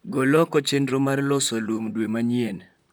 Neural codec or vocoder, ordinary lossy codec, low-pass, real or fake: vocoder, 44.1 kHz, 128 mel bands, Pupu-Vocoder; none; none; fake